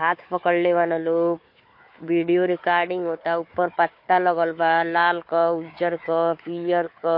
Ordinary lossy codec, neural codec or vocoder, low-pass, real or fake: MP3, 32 kbps; codec, 16 kHz, 4 kbps, FunCodec, trained on Chinese and English, 50 frames a second; 5.4 kHz; fake